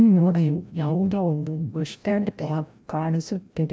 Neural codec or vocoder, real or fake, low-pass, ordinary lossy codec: codec, 16 kHz, 0.5 kbps, FreqCodec, larger model; fake; none; none